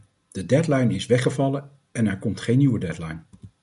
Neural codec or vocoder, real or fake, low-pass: none; real; 10.8 kHz